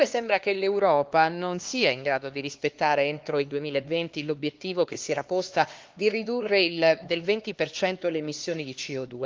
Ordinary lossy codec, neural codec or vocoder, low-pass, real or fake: Opus, 32 kbps; codec, 16 kHz, 2 kbps, X-Codec, HuBERT features, trained on LibriSpeech; 7.2 kHz; fake